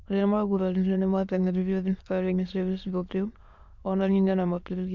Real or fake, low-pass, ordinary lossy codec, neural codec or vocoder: fake; 7.2 kHz; MP3, 64 kbps; autoencoder, 22.05 kHz, a latent of 192 numbers a frame, VITS, trained on many speakers